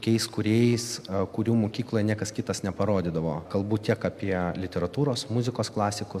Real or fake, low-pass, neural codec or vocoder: real; 14.4 kHz; none